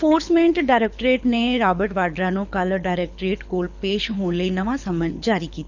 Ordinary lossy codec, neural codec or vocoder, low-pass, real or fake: none; codec, 24 kHz, 6 kbps, HILCodec; 7.2 kHz; fake